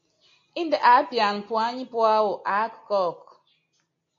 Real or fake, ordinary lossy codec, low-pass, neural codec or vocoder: real; MP3, 48 kbps; 7.2 kHz; none